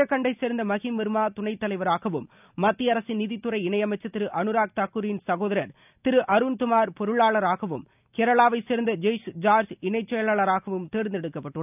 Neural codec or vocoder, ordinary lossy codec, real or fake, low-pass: none; none; real; 3.6 kHz